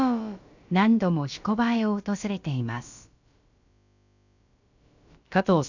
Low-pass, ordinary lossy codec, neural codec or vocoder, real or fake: 7.2 kHz; none; codec, 16 kHz, about 1 kbps, DyCAST, with the encoder's durations; fake